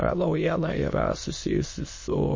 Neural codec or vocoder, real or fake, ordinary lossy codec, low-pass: autoencoder, 22.05 kHz, a latent of 192 numbers a frame, VITS, trained on many speakers; fake; MP3, 32 kbps; 7.2 kHz